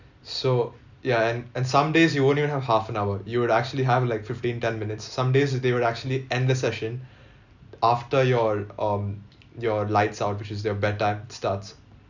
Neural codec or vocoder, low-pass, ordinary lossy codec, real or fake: none; 7.2 kHz; none; real